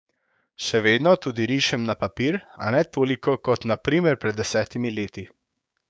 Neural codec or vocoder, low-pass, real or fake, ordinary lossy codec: codec, 16 kHz, 6 kbps, DAC; none; fake; none